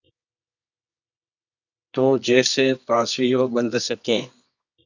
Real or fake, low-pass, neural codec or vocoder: fake; 7.2 kHz; codec, 24 kHz, 0.9 kbps, WavTokenizer, medium music audio release